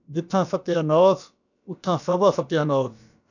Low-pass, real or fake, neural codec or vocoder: 7.2 kHz; fake; codec, 16 kHz, about 1 kbps, DyCAST, with the encoder's durations